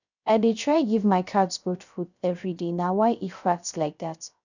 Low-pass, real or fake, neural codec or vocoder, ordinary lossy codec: 7.2 kHz; fake; codec, 16 kHz, 0.3 kbps, FocalCodec; none